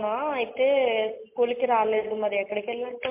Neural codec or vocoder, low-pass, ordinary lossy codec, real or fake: none; 3.6 kHz; MP3, 24 kbps; real